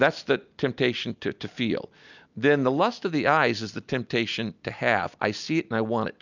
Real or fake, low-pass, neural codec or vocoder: real; 7.2 kHz; none